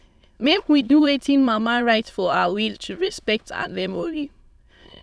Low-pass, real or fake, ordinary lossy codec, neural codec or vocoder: none; fake; none; autoencoder, 22.05 kHz, a latent of 192 numbers a frame, VITS, trained on many speakers